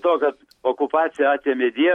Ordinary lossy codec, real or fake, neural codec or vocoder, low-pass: MP3, 64 kbps; real; none; 19.8 kHz